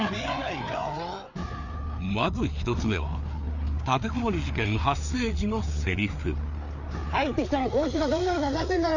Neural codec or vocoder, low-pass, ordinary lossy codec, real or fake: codec, 16 kHz, 4 kbps, FreqCodec, larger model; 7.2 kHz; none; fake